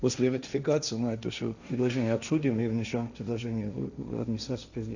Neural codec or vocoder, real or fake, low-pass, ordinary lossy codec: codec, 16 kHz, 1.1 kbps, Voila-Tokenizer; fake; 7.2 kHz; none